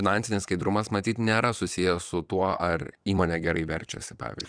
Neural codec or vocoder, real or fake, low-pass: vocoder, 22.05 kHz, 80 mel bands, WaveNeXt; fake; 9.9 kHz